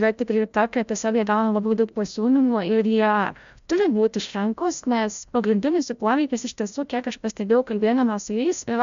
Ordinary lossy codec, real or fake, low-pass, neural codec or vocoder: MP3, 64 kbps; fake; 7.2 kHz; codec, 16 kHz, 0.5 kbps, FreqCodec, larger model